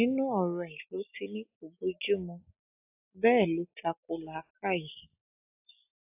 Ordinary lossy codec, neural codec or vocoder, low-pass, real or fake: AAC, 32 kbps; none; 3.6 kHz; real